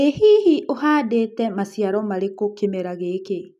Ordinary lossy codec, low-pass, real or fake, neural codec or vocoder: none; 14.4 kHz; real; none